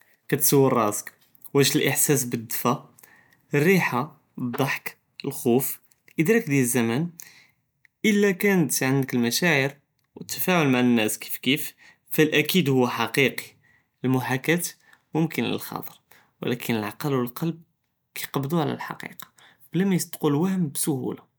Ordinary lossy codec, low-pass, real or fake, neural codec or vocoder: none; none; real; none